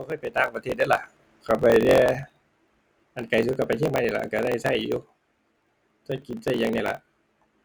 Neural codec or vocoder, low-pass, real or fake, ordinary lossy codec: none; none; real; none